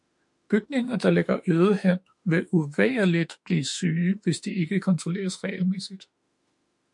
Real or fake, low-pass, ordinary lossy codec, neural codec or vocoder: fake; 10.8 kHz; MP3, 64 kbps; autoencoder, 48 kHz, 32 numbers a frame, DAC-VAE, trained on Japanese speech